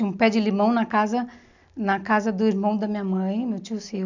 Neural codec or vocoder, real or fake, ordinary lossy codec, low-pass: none; real; none; 7.2 kHz